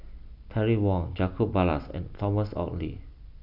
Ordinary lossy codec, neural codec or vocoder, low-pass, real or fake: none; none; 5.4 kHz; real